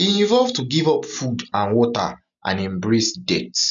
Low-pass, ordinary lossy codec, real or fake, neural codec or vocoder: 7.2 kHz; none; real; none